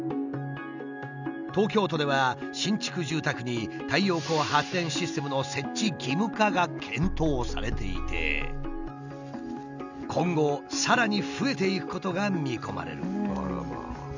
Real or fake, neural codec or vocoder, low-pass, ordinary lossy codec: real; none; 7.2 kHz; none